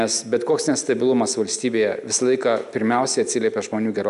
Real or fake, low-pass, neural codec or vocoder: real; 10.8 kHz; none